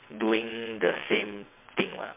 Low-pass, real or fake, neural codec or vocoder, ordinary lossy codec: 3.6 kHz; fake; vocoder, 22.05 kHz, 80 mel bands, WaveNeXt; MP3, 24 kbps